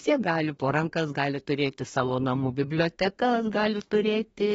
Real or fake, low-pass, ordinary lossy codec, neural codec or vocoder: fake; 14.4 kHz; AAC, 24 kbps; codec, 32 kHz, 1.9 kbps, SNAC